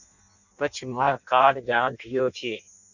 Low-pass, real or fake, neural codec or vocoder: 7.2 kHz; fake; codec, 16 kHz in and 24 kHz out, 0.6 kbps, FireRedTTS-2 codec